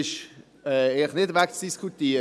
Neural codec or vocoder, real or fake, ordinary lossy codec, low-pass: none; real; none; none